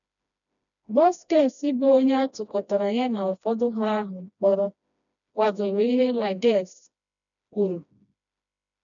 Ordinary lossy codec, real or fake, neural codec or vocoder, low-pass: none; fake; codec, 16 kHz, 1 kbps, FreqCodec, smaller model; 7.2 kHz